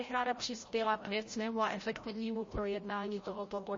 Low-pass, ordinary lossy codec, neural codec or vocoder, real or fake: 7.2 kHz; MP3, 32 kbps; codec, 16 kHz, 0.5 kbps, FreqCodec, larger model; fake